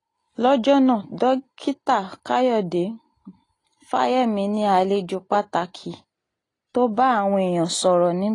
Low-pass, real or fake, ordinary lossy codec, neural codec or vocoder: 10.8 kHz; real; AAC, 32 kbps; none